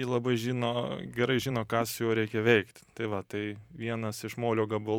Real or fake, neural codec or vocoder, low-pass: fake; vocoder, 44.1 kHz, 128 mel bands every 512 samples, BigVGAN v2; 19.8 kHz